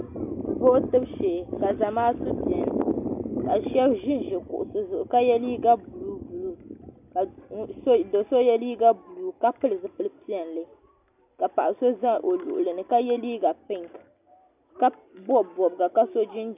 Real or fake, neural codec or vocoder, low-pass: real; none; 3.6 kHz